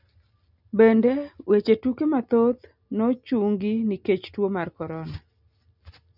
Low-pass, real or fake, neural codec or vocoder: 5.4 kHz; real; none